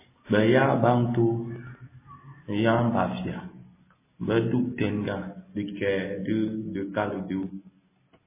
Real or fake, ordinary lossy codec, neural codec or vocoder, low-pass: real; MP3, 16 kbps; none; 3.6 kHz